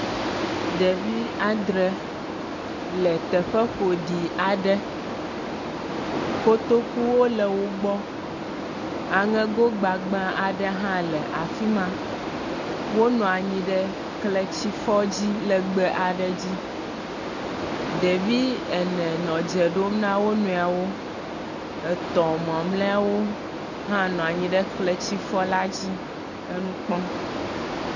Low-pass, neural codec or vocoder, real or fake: 7.2 kHz; none; real